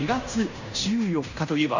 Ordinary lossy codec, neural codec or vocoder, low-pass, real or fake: none; codec, 16 kHz in and 24 kHz out, 0.9 kbps, LongCat-Audio-Codec, fine tuned four codebook decoder; 7.2 kHz; fake